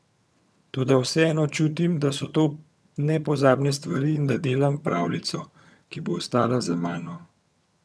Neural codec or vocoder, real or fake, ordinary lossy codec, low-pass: vocoder, 22.05 kHz, 80 mel bands, HiFi-GAN; fake; none; none